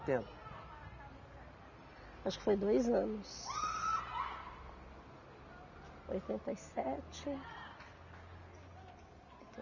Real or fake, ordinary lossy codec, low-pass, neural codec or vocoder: real; none; 7.2 kHz; none